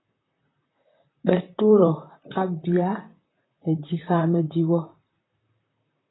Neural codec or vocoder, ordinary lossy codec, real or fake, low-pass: vocoder, 44.1 kHz, 128 mel bands, Pupu-Vocoder; AAC, 16 kbps; fake; 7.2 kHz